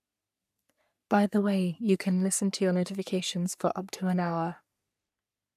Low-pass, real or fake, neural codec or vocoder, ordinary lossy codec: 14.4 kHz; fake; codec, 44.1 kHz, 3.4 kbps, Pupu-Codec; none